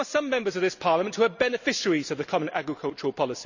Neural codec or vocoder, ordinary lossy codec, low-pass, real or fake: none; none; 7.2 kHz; real